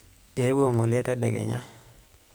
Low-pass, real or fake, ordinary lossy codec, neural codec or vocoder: none; fake; none; codec, 44.1 kHz, 2.6 kbps, SNAC